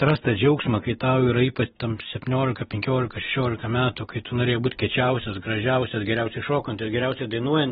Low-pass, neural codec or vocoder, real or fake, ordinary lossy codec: 19.8 kHz; none; real; AAC, 16 kbps